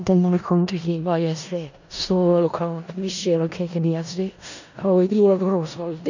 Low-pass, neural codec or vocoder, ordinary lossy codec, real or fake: 7.2 kHz; codec, 16 kHz in and 24 kHz out, 0.4 kbps, LongCat-Audio-Codec, four codebook decoder; none; fake